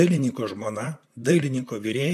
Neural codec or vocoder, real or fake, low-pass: vocoder, 44.1 kHz, 128 mel bands, Pupu-Vocoder; fake; 14.4 kHz